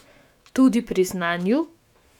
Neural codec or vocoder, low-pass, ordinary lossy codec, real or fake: autoencoder, 48 kHz, 128 numbers a frame, DAC-VAE, trained on Japanese speech; 19.8 kHz; none; fake